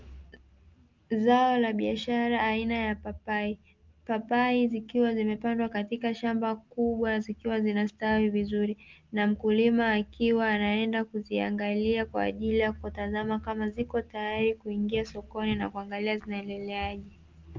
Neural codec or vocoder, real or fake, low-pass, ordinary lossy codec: none; real; 7.2 kHz; Opus, 24 kbps